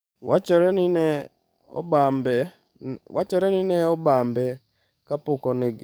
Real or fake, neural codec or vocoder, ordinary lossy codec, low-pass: fake; codec, 44.1 kHz, 7.8 kbps, DAC; none; none